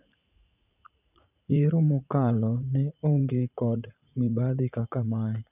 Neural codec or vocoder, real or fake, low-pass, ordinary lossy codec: vocoder, 44.1 kHz, 80 mel bands, Vocos; fake; 3.6 kHz; none